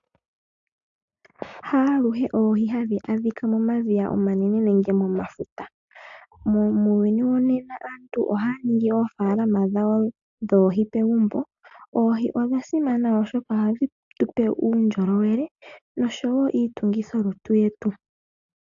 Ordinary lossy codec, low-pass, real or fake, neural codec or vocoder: AAC, 64 kbps; 7.2 kHz; real; none